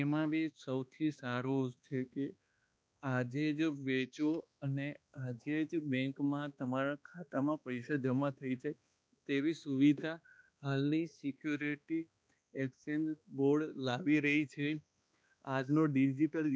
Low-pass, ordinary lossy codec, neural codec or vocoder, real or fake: none; none; codec, 16 kHz, 2 kbps, X-Codec, HuBERT features, trained on balanced general audio; fake